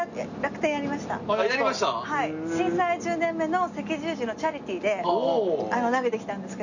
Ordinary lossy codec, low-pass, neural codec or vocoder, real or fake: none; 7.2 kHz; none; real